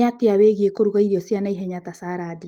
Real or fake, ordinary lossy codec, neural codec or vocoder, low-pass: real; Opus, 24 kbps; none; 19.8 kHz